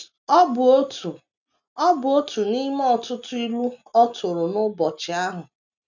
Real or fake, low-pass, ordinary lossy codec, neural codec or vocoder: real; 7.2 kHz; none; none